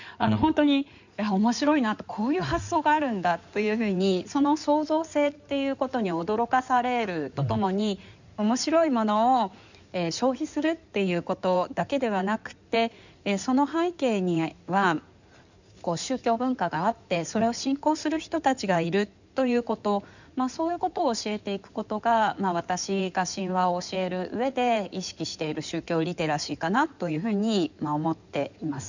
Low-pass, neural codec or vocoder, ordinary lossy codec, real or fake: 7.2 kHz; codec, 16 kHz in and 24 kHz out, 2.2 kbps, FireRedTTS-2 codec; none; fake